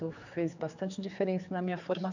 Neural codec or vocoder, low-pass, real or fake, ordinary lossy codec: codec, 16 kHz, 4 kbps, X-Codec, HuBERT features, trained on general audio; 7.2 kHz; fake; none